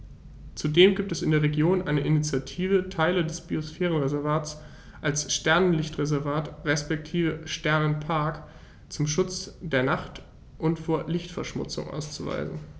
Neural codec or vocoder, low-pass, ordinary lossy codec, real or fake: none; none; none; real